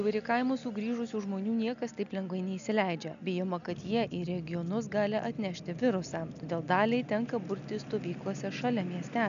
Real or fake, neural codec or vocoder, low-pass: real; none; 7.2 kHz